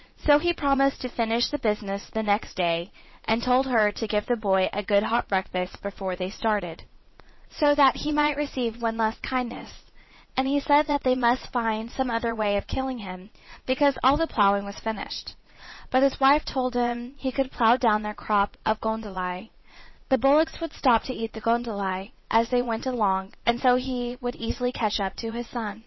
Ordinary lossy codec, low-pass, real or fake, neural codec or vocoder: MP3, 24 kbps; 7.2 kHz; fake; vocoder, 22.05 kHz, 80 mel bands, Vocos